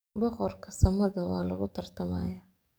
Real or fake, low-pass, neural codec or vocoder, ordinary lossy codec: fake; none; codec, 44.1 kHz, 7.8 kbps, DAC; none